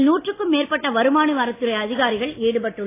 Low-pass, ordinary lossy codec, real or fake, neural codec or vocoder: 3.6 kHz; AAC, 24 kbps; real; none